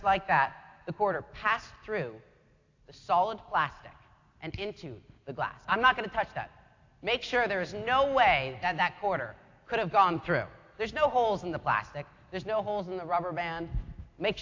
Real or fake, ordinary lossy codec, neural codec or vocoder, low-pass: real; AAC, 48 kbps; none; 7.2 kHz